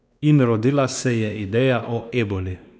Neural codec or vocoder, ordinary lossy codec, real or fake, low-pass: codec, 16 kHz, 2 kbps, X-Codec, WavLM features, trained on Multilingual LibriSpeech; none; fake; none